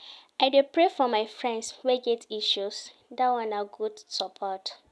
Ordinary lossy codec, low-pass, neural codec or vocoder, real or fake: none; none; none; real